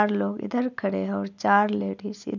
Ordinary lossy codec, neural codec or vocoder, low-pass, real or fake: none; none; 7.2 kHz; real